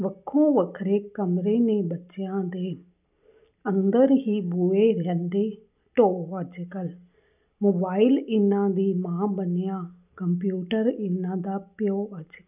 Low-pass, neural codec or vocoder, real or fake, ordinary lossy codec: 3.6 kHz; none; real; none